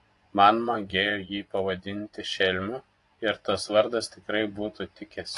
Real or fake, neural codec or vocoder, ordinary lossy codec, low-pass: fake; vocoder, 24 kHz, 100 mel bands, Vocos; AAC, 48 kbps; 10.8 kHz